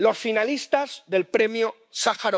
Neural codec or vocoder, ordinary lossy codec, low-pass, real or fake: codec, 16 kHz, 6 kbps, DAC; none; none; fake